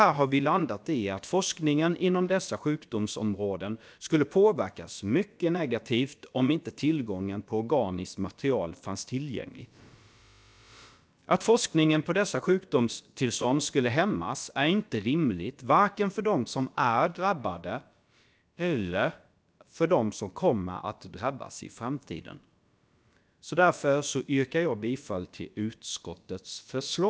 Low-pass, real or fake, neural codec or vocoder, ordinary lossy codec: none; fake; codec, 16 kHz, about 1 kbps, DyCAST, with the encoder's durations; none